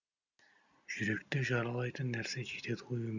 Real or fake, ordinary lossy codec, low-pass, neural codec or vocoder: real; none; 7.2 kHz; none